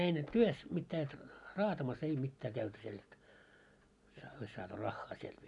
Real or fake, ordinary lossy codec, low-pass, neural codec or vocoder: real; none; 10.8 kHz; none